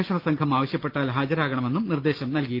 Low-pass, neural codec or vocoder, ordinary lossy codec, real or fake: 5.4 kHz; none; Opus, 24 kbps; real